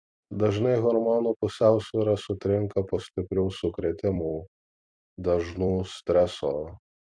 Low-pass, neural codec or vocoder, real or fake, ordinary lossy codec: 9.9 kHz; vocoder, 44.1 kHz, 128 mel bands every 256 samples, BigVGAN v2; fake; MP3, 64 kbps